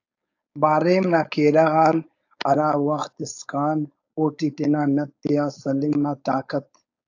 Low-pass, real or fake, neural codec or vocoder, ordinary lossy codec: 7.2 kHz; fake; codec, 16 kHz, 4.8 kbps, FACodec; AAC, 48 kbps